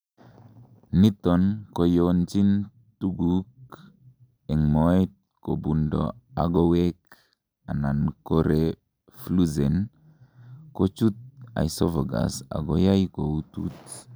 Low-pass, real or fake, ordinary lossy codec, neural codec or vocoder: none; real; none; none